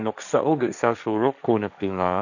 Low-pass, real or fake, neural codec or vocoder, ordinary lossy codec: 7.2 kHz; fake; codec, 16 kHz, 1.1 kbps, Voila-Tokenizer; none